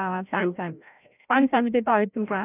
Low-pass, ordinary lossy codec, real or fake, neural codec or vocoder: 3.6 kHz; none; fake; codec, 16 kHz, 0.5 kbps, FreqCodec, larger model